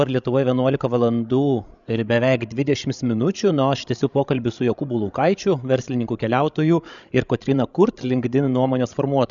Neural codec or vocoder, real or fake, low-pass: codec, 16 kHz, 16 kbps, FreqCodec, larger model; fake; 7.2 kHz